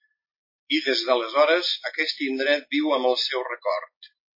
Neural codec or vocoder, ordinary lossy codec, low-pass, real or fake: none; MP3, 24 kbps; 5.4 kHz; real